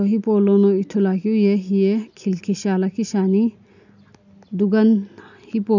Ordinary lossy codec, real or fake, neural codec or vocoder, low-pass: none; real; none; 7.2 kHz